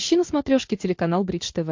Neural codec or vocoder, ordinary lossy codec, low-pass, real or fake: none; MP3, 48 kbps; 7.2 kHz; real